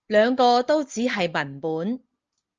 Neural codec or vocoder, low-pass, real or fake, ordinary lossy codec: none; 7.2 kHz; real; Opus, 24 kbps